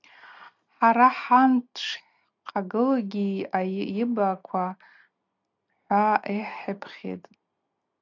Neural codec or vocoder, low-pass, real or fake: none; 7.2 kHz; real